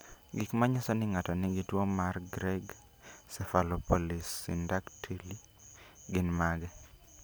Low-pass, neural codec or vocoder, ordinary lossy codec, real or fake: none; none; none; real